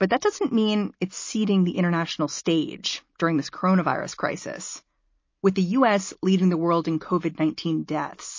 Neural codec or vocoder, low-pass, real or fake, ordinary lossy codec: none; 7.2 kHz; real; MP3, 32 kbps